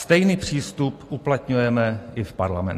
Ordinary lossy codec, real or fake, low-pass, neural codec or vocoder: AAC, 48 kbps; real; 14.4 kHz; none